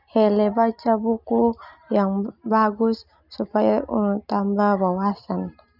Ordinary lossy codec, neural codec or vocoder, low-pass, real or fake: none; none; 5.4 kHz; real